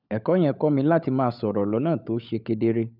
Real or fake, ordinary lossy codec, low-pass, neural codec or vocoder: fake; none; 5.4 kHz; codec, 16 kHz, 16 kbps, FunCodec, trained on LibriTTS, 50 frames a second